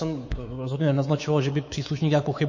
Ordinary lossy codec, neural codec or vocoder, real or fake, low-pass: MP3, 32 kbps; codec, 24 kHz, 3.1 kbps, DualCodec; fake; 7.2 kHz